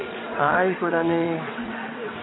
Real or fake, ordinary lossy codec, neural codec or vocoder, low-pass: fake; AAC, 16 kbps; vocoder, 22.05 kHz, 80 mel bands, WaveNeXt; 7.2 kHz